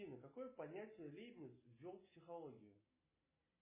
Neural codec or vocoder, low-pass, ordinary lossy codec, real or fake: none; 3.6 kHz; MP3, 16 kbps; real